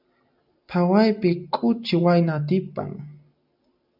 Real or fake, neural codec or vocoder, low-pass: real; none; 5.4 kHz